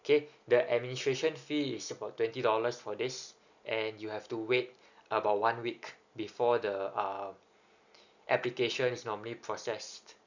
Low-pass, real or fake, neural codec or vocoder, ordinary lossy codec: 7.2 kHz; real; none; none